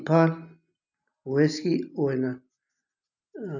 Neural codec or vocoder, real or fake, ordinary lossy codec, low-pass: none; real; none; 7.2 kHz